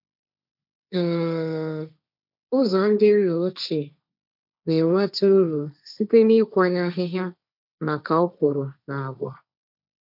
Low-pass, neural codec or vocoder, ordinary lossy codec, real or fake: 5.4 kHz; codec, 16 kHz, 1.1 kbps, Voila-Tokenizer; none; fake